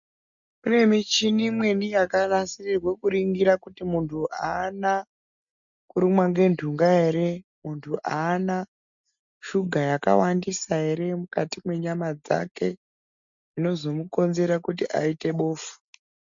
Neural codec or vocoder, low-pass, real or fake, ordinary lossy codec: none; 7.2 kHz; real; MP3, 64 kbps